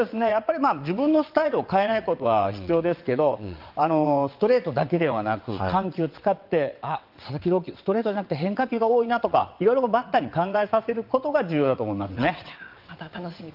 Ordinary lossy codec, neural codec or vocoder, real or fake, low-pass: Opus, 24 kbps; vocoder, 22.05 kHz, 80 mel bands, WaveNeXt; fake; 5.4 kHz